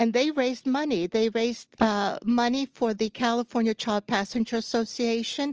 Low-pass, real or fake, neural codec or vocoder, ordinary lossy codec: 7.2 kHz; real; none; Opus, 24 kbps